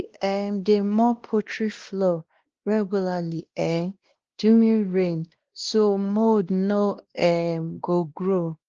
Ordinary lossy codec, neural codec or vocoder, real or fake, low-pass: Opus, 16 kbps; codec, 16 kHz, 1 kbps, X-Codec, WavLM features, trained on Multilingual LibriSpeech; fake; 7.2 kHz